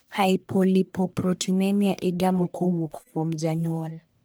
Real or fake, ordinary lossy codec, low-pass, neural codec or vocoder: fake; none; none; codec, 44.1 kHz, 1.7 kbps, Pupu-Codec